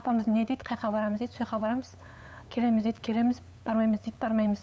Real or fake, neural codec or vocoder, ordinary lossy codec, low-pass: fake; codec, 16 kHz, 8 kbps, FunCodec, trained on LibriTTS, 25 frames a second; none; none